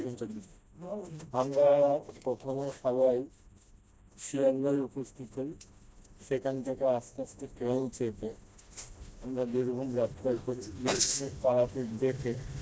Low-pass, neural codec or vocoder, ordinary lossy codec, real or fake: none; codec, 16 kHz, 1 kbps, FreqCodec, smaller model; none; fake